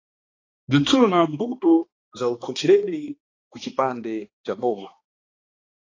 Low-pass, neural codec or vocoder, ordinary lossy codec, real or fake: 7.2 kHz; codec, 16 kHz, 2 kbps, X-Codec, HuBERT features, trained on balanced general audio; AAC, 32 kbps; fake